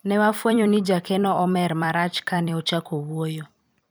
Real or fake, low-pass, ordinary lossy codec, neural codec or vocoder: fake; none; none; vocoder, 44.1 kHz, 128 mel bands every 256 samples, BigVGAN v2